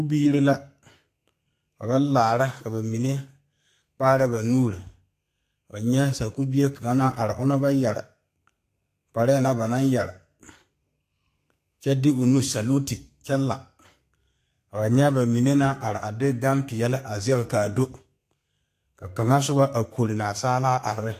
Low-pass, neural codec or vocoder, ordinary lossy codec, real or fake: 14.4 kHz; codec, 32 kHz, 1.9 kbps, SNAC; AAC, 64 kbps; fake